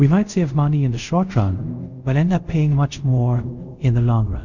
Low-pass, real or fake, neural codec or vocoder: 7.2 kHz; fake; codec, 24 kHz, 0.5 kbps, DualCodec